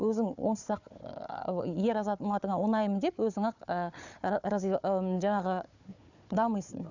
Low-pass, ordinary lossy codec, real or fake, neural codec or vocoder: 7.2 kHz; none; fake; codec, 16 kHz, 4 kbps, FunCodec, trained on Chinese and English, 50 frames a second